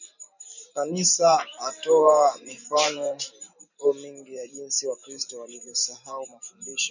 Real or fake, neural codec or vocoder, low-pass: fake; vocoder, 44.1 kHz, 128 mel bands every 512 samples, BigVGAN v2; 7.2 kHz